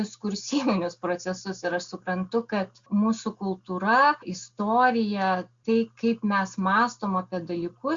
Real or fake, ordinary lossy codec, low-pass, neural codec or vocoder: real; Opus, 64 kbps; 7.2 kHz; none